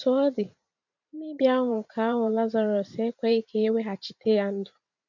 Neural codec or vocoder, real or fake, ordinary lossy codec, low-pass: vocoder, 44.1 kHz, 128 mel bands every 512 samples, BigVGAN v2; fake; none; 7.2 kHz